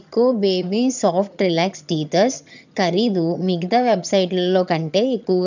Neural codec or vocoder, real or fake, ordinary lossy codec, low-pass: vocoder, 22.05 kHz, 80 mel bands, HiFi-GAN; fake; none; 7.2 kHz